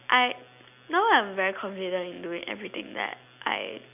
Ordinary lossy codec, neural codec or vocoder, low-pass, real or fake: none; none; 3.6 kHz; real